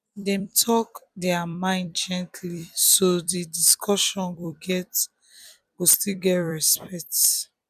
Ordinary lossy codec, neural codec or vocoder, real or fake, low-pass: none; vocoder, 44.1 kHz, 128 mel bands every 256 samples, BigVGAN v2; fake; 14.4 kHz